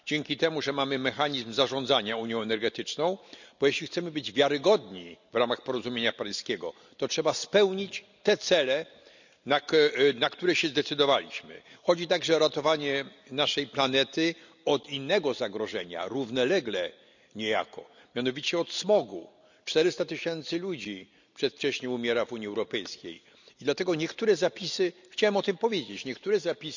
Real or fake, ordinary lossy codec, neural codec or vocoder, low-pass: real; none; none; 7.2 kHz